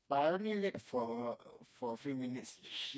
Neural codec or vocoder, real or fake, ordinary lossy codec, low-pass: codec, 16 kHz, 2 kbps, FreqCodec, smaller model; fake; none; none